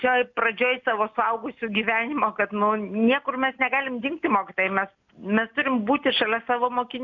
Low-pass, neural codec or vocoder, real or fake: 7.2 kHz; none; real